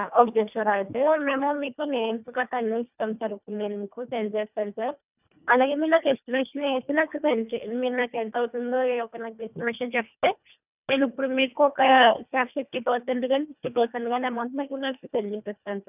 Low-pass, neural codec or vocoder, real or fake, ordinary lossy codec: 3.6 kHz; codec, 24 kHz, 1.5 kbps, HILCodec; fake; none